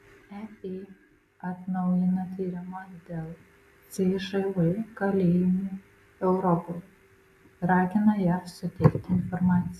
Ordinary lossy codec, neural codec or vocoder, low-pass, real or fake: AAC, 96 kbps; none; 14.4 kHz; real